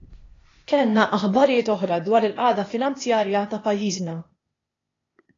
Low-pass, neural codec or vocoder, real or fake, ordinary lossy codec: 7.2 kHz; codec, 16 kHz, 0.8 kbps, ZipCodec; fake; AAC, 32 kbps